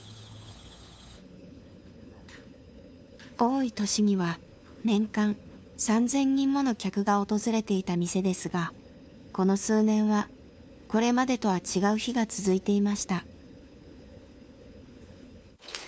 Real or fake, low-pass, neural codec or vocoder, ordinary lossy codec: fake; none; codec, 16 kHz, 4 kbps, FunCodec, trained on LibriTTS, 50 frames a second; none